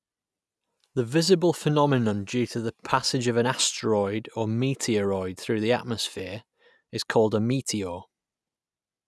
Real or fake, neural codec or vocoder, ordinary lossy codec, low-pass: real; none; none; none